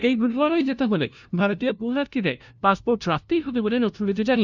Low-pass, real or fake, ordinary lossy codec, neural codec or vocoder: 7.2 kHz; fake; none; codec, 16 kHz, 0.5 kbps, FunCodec, trained on LibriTTS, 25 frames a second